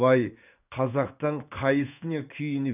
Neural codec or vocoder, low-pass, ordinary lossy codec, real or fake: codec, 16 kHz in and 24 kHz out, 1 kbps, XY-Tokenizer; 3.6 kHz; none; fake